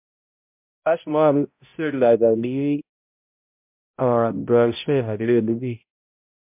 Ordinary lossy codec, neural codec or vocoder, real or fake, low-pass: MP3, 32 kbps; codec, 16 kHz, 0.5 kbps, X-Codec, HuBERT features, trained on balanced general audio; fake; 3.6 kHz